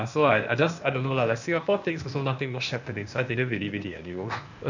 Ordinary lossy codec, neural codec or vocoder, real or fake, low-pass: none; codec, 16 kHz, 0.8 kbps, ZipCodec; fake; 7.2 kHz